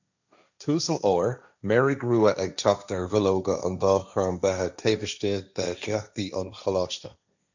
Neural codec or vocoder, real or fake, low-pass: codec, 16 kHz, 1.1 kbps, Voila-Tokenizer; fake; 7.2 kHz